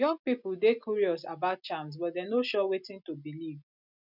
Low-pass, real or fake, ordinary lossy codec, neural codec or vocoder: 5.4 kHz; real; none; none